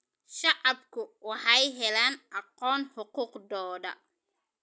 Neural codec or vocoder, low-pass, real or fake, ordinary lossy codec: none; none; real; none